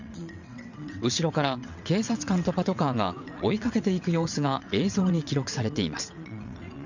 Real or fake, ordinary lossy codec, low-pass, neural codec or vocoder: fake; none; 7.2 kHz; vocoder, 22.05 kHz, 80 mel bands, WaveNeXt